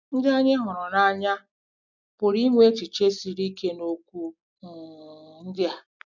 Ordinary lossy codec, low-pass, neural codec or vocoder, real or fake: none; 7.2 kHz; none; real